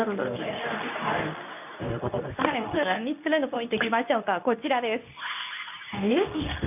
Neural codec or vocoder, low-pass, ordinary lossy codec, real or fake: codec, 24 kHz, 0.9 kbps, WavTokenizer, medium speech release version 2; 3.6 kHz; none; fake